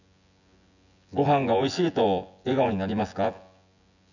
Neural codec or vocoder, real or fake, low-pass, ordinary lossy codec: vocoder, 24 kHz, 100 mel bands, Vocos; fake; 7.2 kHz; none